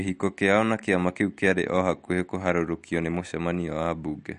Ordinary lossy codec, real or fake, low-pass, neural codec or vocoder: MP3, 48 kbps; real; 14.4 kHz; none